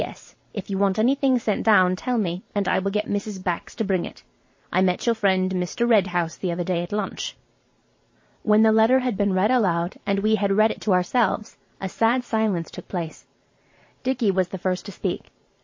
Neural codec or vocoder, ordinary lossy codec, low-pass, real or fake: vocoder, 44.1 kHz, 128 mel bands every 512 samples, BigVGAN v2; MP3, 32 kbps; 7.2 kHz; fake